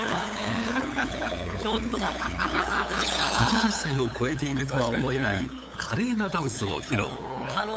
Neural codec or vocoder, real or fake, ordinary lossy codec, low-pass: codec, 16 kHz, 8 kbps, FunCodec, trained on LibriTTS, 25 frames a second; fake; none; none